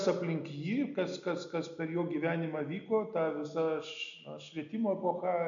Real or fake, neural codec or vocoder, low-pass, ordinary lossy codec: real; none; 7.2 kHz; MP3, 96 kbps